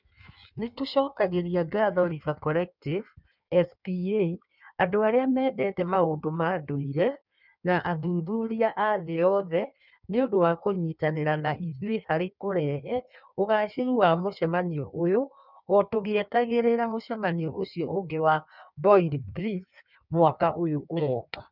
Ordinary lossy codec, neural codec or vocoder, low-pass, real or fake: none; codec, 16 kHz in and 24 kHz out, 1.1 kbps, FireRedTTS-2 codec; 5.4 kHz; fake